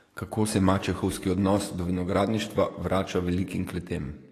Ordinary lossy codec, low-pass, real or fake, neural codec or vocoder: AAC, 48 kbps; 14.4 kHz; fake; codec, 44.1 kHz, 7.8 kbps, DAC